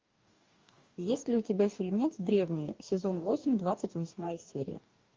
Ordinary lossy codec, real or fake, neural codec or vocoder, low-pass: Opus, 32 kbps; fake; codec, 44.1 kHz, 2.6 kbps, DAC; 7.2 kHz